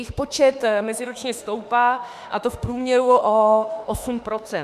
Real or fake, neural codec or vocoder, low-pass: fake; autoencoder, 48 kHz, 32 numbers a frame, DAC-VAE, trained on Japanese speech; 14.4 kHz